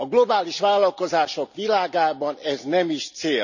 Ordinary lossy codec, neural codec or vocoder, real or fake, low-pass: none; none; real; 7.2 kHz